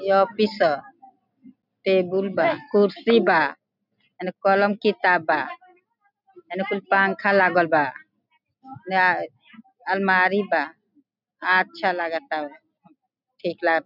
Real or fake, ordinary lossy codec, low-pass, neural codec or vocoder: real; none; 5.4 kHz; none